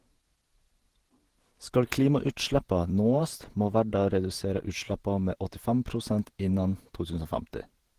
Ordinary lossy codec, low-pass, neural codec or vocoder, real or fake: Opus, 16 kbps; 14.4 kHz; vocoder, 48 kHz, 128 mel bands, Vocos; fake